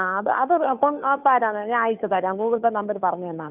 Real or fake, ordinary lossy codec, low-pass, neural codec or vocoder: fake; none; 3.6 kHz; codec, 16 kHz, 2 kbps, FunCodec, trained on Chinese and English, 25 frames a second